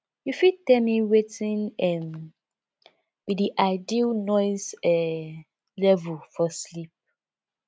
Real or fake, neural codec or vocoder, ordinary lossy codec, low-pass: real; none; none; none